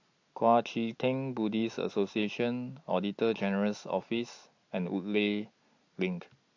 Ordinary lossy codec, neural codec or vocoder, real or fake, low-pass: AAC, 48 kbps; none; real; 7.2 kHz